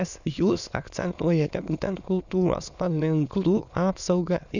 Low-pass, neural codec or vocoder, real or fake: 7.2 kHz; autoencoder, 22.05 kHz, a latent of 192 numbers a frame, VITS, trained on many speakers; fake